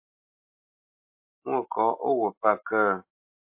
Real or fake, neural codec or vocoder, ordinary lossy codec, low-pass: real; none; MP3, 32 kbps; 3.6 kHz